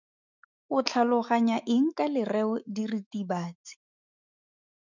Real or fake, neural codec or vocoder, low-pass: fake; autoencoder, 48 kHz, 128 numbers a frame, DAC-VAE, trained on Japanese speech; 7.2 kHz